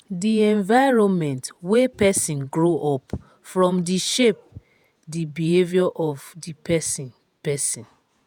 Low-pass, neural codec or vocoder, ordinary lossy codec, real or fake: none; vocoder, 48 kHz, 128 mel bands, Vocos; none; fake